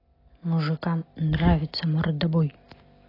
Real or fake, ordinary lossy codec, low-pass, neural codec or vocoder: real; AAC, 24 kbps; 5.4 kHz; none